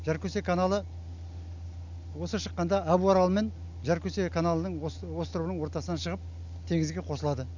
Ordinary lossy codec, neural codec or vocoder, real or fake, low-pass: none; none; real; 7.2 kHz